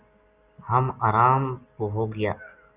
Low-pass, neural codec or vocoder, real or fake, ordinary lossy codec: 3.6 kHz; none; real; Opus, 64 kbps